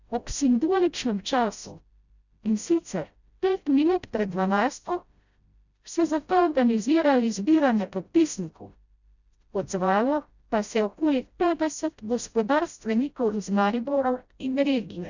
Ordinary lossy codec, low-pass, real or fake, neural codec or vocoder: none; 7.2 kHz; fake; codec, 16 kHz, 0.5 kbps, FreqCodec, smaller model